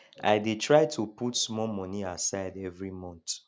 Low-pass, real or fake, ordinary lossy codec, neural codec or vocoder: none; real; none; none